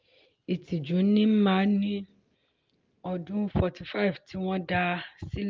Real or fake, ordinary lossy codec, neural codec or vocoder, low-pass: real; Opus, 24 kbps; none; 7.2 kHz